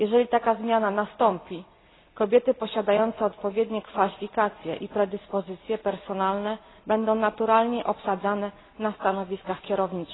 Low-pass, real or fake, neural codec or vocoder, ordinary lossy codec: 7.2 kHz; real; none; AAC, 16 kbps